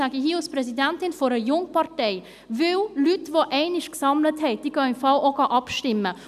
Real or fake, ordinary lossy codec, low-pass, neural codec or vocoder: real; none; 14.4 kHz; none